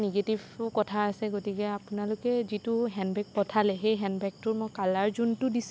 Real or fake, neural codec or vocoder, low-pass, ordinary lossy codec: real; none; none; none